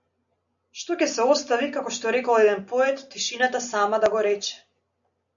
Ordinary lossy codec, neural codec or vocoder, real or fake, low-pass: MP3, 96 kbps; none; real; 7.2 kHz